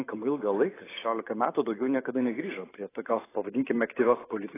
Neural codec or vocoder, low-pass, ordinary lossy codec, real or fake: vocoder, 44.1 kHz, 128 mel bands every 512 samples, BigVGAN v2; 3.6 kHz; AAC, 16 kbps; fake